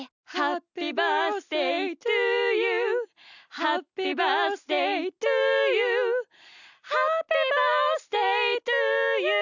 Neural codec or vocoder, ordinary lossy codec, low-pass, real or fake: none; none; 7.2 kHz; real